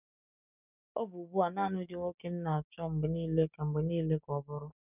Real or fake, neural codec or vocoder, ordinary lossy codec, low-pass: fake; codec, 16 kHz, 6 kbps, DAC; none; 3.6 kHz